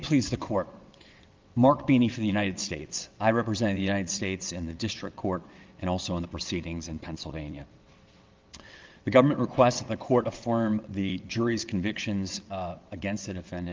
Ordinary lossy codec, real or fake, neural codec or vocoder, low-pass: Opus, 32 kbps; fake; vocoder, 22.05 kHz, 80 mel bands, WaveNeXt; 7.2 kHz